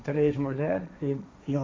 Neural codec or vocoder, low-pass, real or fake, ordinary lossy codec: codec, 16 kHz, 1.1 kbps, Voila-Tokenizer; none; fake; none